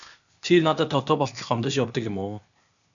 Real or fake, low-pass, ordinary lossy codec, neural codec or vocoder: fake; 7.2 kHz; MP3, 96 kbps; codec, 16 kHz, 0.8 kbps, ZipCodec